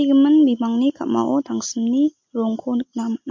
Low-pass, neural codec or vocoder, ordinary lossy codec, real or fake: 7.2 kHz; none; MP3, 48 kbps; real